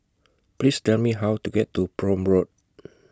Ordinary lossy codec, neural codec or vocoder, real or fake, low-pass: none; none; real; none